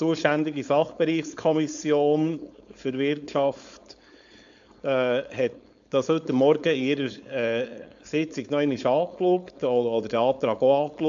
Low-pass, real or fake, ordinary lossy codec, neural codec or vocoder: 7.2 kHz; fake; AAC, 64 kbps; codec, 16 kHz, 4.8 kbps, FACodec